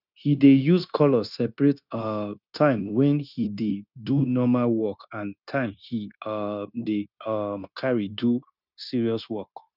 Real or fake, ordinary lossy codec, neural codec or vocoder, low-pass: fake; none; codec, 16 kHz, 0.9 kbps, LongCat-Audio-Codec; 5.4 kHz